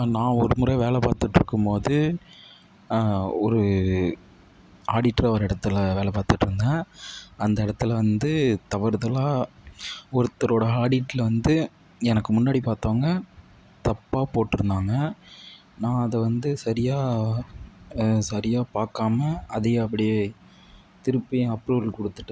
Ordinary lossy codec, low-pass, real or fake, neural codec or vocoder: none; none; real; none